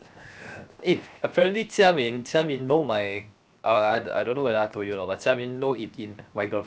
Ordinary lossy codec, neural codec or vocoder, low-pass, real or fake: none; codec, 16 kHz, 0.7 kbps, FocalCodec; none; fake